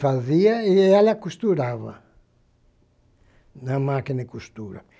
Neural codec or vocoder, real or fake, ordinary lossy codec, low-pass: none; real; none; none